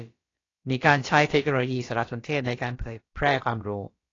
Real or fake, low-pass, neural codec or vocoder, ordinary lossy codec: fake; 7.2 kHz; codec, 16 kHz, about 1 kbps, DyCAST, with the encoder's durations; AAC, 32 kbps